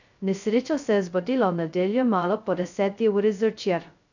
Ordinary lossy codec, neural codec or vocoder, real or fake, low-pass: none; codec, 16 kHz, 0.2 kbps, FocalCodec; fake; 7.2 kHz